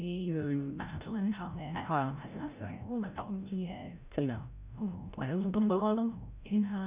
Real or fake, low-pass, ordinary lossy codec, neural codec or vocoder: fake; 3.6 kHz; Opus, 64 kbps; codec, 16 kHz, 0.5 kbps, FreqCodec, larger model